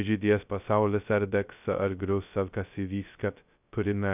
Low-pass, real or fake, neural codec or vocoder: 3.6 kHz; fake; codec, 16 kHz, 0.2 kbps, FocalCodec